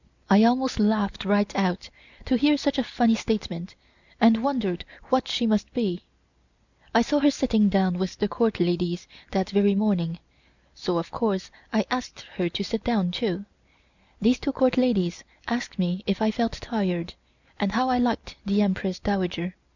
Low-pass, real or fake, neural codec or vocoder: 7.2 kHz; real; none